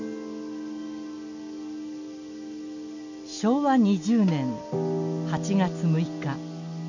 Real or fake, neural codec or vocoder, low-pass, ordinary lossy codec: real; none; 7.2 kHz; none